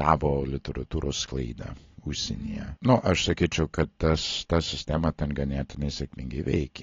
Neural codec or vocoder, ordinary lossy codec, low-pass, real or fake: none; AAC, 32 kbps; 7.2 kHz; real